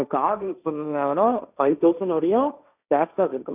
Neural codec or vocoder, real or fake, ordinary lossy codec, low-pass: codec, 16 kHz, 1.1 kbps, Voila-Tokenizer; fake; none; 3.6 kHz